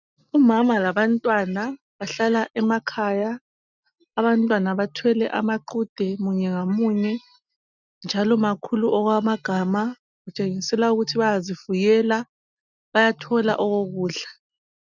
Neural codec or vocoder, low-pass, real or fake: none; 7.2 kHz; real